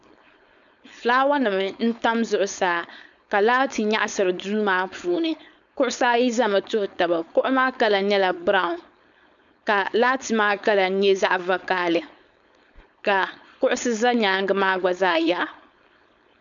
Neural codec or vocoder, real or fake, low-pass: codec, 16 kHz, 4.8 kbps, FACodec; fake; 7.2 kHz